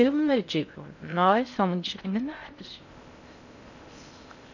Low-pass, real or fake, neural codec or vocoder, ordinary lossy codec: 7.2 kHz; fake; codec, 16 kHz in and 24 kHz out, 0.6 kbps, FocalCodec, streaming, 2048 codes; none